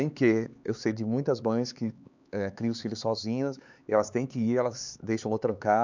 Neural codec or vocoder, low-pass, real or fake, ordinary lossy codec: codec, 16 kHz, 4 kbps, X-Codec, HuBERT features, trained on LibriSpeech; 7.2 kHz; fake; none